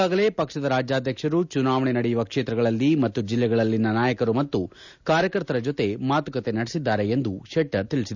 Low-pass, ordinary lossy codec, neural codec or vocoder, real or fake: 7.2 kHz; none; none; real